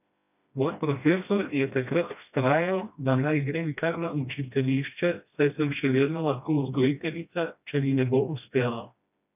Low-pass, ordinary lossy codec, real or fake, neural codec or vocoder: 3.6 kHz; none; fake; codec, 16 kHz, 1 kbps, FreqCodec, smaller model